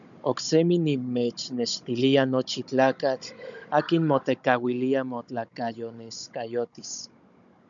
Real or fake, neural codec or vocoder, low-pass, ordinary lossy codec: fake; codec, 16 kHz, 16 kbps, FunCodec, trained on Chinese and English, 50 frames a second; 7.2 kHz; MP3, 96 kbps